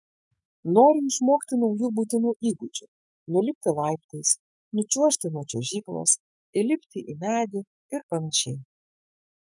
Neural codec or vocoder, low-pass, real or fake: autoencoder, 48 kHz, 128 numbers a frame, DAC-VAE, trained on Japanese speech; 10.8 kHz; fake